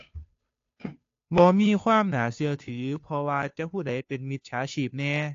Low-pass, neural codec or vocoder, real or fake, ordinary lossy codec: 7.2 kHz; codec, 16 kHz, 2 kbps, FunCodec, trained on Chinese and English, 25 frames a second; fake; AAC, 48 kbps